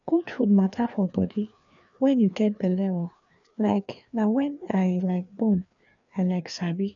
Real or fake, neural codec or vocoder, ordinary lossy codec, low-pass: fake; codec, 16 kHz, 2 kbps, FreqCodec, larger model; none; 7.2 kHz